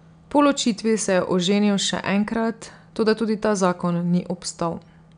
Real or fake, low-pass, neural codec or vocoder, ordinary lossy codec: real; 9.9 kHz; none; none